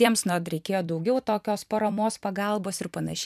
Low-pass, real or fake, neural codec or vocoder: 14.4 kHz; fake; vocoder, 44.1 kHz, 128 mel bands every 256 samples, BigVGAN v2